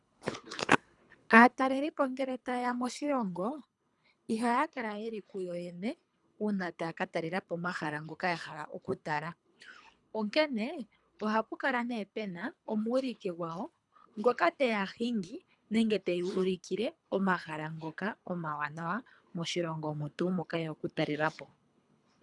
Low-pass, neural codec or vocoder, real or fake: 10.8 kHz; codec, 24 kHz, 3 kbps, HILCodec; fake